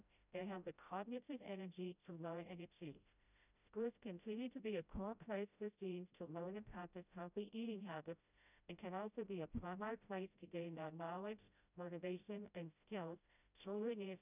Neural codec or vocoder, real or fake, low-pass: codec, 16 kHz, 0.5 kbps, FreqCodec, smaller model; fake; 3.6 kHz